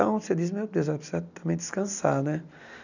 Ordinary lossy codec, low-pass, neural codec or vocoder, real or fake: none; 7.2 kHz; none; real